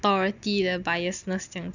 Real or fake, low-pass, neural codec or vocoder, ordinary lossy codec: real; 7.2 kHz; none; none